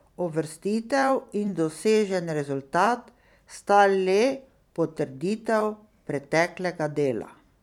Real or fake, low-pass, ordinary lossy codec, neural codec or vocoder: fake; 19.8 kHz; none; vocoder, 44.1 kHz, 128 mel bands every 512 samples, BigVGAN v2